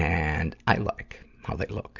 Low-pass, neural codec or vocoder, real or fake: 7.2 kHz; none; real